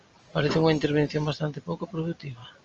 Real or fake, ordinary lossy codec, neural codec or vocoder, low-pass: real; Opus, 24 kbps; none; 7.2 kHz